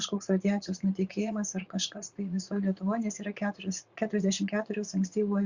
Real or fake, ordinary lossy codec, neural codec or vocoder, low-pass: fake; Opus, 64 kbps; vocoder, 44.1 kHz, 80 mel bands, Vocos; 7.2 kHz